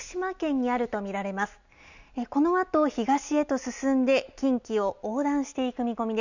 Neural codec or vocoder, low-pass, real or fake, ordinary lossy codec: none; 7.2 kHz; real; none